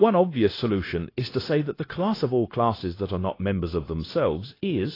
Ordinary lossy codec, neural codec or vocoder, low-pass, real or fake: AAC, 24 kbps; codec, 16 kHz, 0.9 kbps, LongCat-Audio-Codec; 5.4 kHz; fake